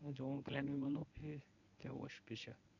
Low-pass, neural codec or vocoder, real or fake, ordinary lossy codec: 7.2 kHz; codec, 24 kHz, 0.9 kbps, WavTokenizer, medium speech release version 1; fake; none